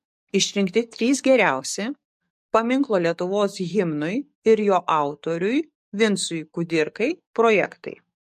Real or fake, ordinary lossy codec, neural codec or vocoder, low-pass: fake; MP3, 64 kbps; codec, 44.1 kHz, 7.8 kbps, DAC; 14.4 kHz